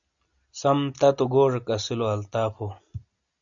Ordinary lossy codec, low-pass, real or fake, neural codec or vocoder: MP3, 96 kbps; 7.2 kHz; real; none